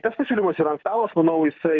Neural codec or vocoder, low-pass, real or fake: codec, 24 kHz, 6 kbps, HILCodec; 7.2 kHz; fake